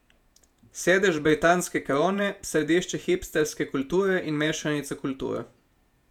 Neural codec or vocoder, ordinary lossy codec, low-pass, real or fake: vocoder, 48 kHz, 128 mel bands, Vocos; none; 19.8 kHz; fake